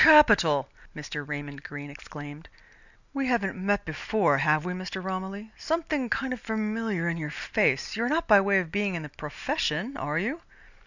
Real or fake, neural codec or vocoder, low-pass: real; none; 7.2 kHz